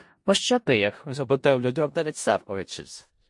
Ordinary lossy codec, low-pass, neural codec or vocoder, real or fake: MP3, 48 kbps; 10.8 kHz; codec, 16 kHz in and 24 kHz out, 0.4 kbps, LongCat-Audio-Codec, four codebook decoder; fake